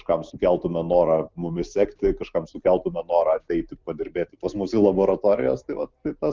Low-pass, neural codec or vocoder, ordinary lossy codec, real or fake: 7.2 kHz; none; Opus, 32 kbps; real